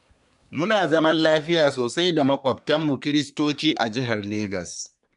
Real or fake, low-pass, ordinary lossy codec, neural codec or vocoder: fake; 10.8 kHz; none; codec, 24 kHz, 1 kbps, SNAC